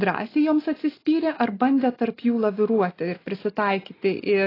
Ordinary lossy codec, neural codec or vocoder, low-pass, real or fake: AAC, 24 kbps; none; 5.4 kHz; real